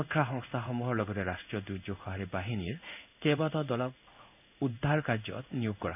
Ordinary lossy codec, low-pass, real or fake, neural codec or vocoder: none; 3.6 kHz; fake; codec, 16 kHz in and 24 kHz out, 1 kbps, XY-Tokenizer